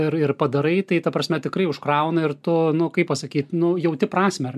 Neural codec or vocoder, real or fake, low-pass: none; real; 14.4 kHz